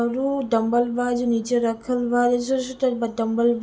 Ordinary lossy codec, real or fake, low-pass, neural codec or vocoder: none; real; none; none